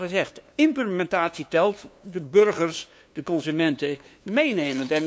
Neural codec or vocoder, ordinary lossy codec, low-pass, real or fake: codec, 16 kHz, 2 kbps, FunCodec, trained on LibriTTS, 25 frames a second; none; none; fake